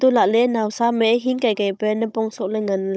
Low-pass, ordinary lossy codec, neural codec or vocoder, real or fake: none; none; codec, 16 kHz, 16 kbps, FunCodec, trained on Chinese and English, 50 frames a second; fake